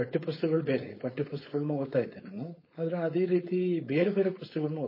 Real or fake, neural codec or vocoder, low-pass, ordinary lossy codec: fake; codec, 16 kHz, 4.8 kbps, FACodec; 5.4 kHz; MP3, 24 kbps